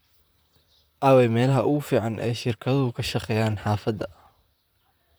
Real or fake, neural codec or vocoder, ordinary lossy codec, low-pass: fake; vocoder, 44.1 kHz, 128 mel bands, Pupu-Vocoder; none; none